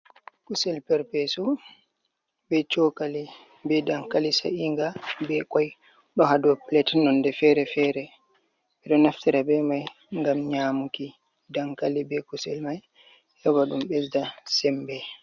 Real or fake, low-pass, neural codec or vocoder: real; 7.2 kHz; none